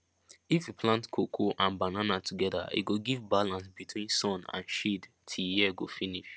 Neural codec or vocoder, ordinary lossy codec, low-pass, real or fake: none; none; none; real